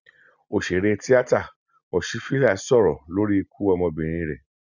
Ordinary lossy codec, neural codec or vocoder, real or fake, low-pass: none; none; real; 7.2 kHz